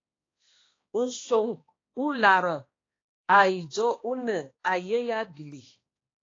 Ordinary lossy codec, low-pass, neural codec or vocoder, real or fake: AAC, 32 kbps; 7.2 kHz; codec, 16 kHz, 1 kbps, X-Codec, HuBERT features, trained on balanced general audio; fake